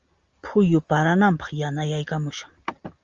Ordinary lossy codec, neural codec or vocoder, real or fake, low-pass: Opus, 32 kbps; none; real; 7.2 kHz